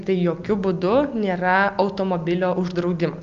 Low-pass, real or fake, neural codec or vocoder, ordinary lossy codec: 7.2 kHz; real; none; Opus, 32 kbps